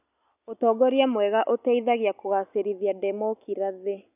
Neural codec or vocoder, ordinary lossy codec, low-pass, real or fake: none; none; 3.6 kHz; real